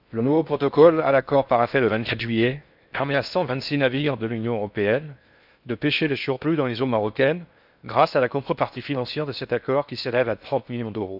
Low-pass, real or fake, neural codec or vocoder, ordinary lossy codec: 5.4 kHz; fake; codec, 16 kHz in and 24 kHz out, 0.6 kbps, FocalCodec, streaming, 4096 codes; none